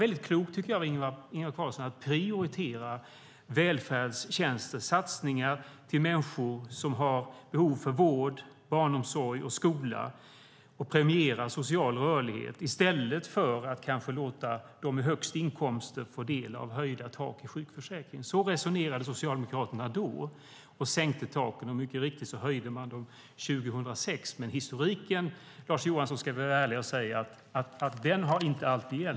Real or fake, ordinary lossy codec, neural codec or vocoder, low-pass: real; none; none; none